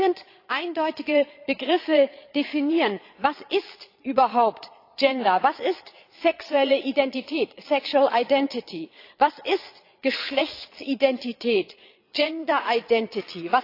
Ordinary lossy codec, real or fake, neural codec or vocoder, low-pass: AAC, 32 kbps; fake; vocoder, 22.05 kHz, 80 mel bands, Vocos; 5.4 kHz